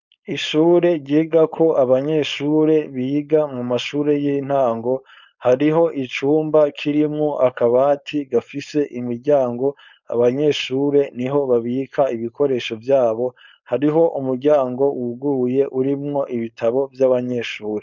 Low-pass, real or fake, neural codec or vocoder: 7.2 kHz; fake; codec, 16 kHz, 4.8 kbps, FACodec